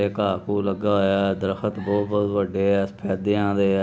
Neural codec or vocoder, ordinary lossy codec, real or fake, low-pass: none; none; real; none